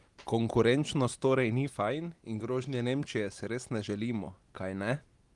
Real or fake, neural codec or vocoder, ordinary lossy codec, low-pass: real; none; Opus, 24 kbps; 10.8 kHz